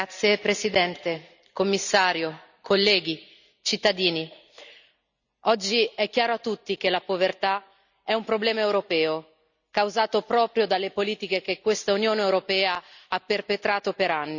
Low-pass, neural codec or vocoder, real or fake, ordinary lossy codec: 7.2 kHz; none; real; none